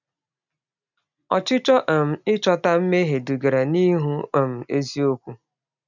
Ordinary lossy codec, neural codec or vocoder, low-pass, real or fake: none; none; 7.2 kHz; real